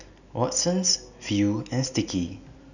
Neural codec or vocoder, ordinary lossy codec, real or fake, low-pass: none; none; real; 7.2 kHz